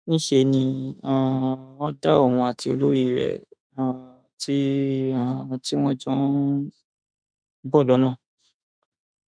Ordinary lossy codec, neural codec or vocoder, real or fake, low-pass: none; autoencoder, 48 kHz, 32 numbers a frame, DAC-VAE, trained on Japanese speech; fake; 9.9 kHz